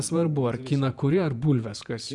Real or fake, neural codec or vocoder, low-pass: fake; vocoder, 48 kHz, 128 mel bands, Vocos; 10.8 kHz